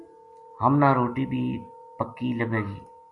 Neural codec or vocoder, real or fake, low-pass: none; real; 10.8 kHz